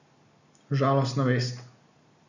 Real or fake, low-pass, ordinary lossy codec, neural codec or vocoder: real; 7.2 kHz; none; none